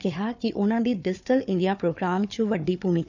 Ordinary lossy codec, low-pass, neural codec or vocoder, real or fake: none; 7.2 kHz; codec, 16 kHz, 4 kbps, FunCodec, trained on Chinese and English, 50 frames a second; fake